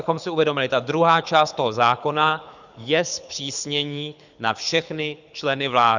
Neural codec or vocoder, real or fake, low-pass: codec, 24 kHz, 6 kbps, HILCodec; fake; 7.2 kHz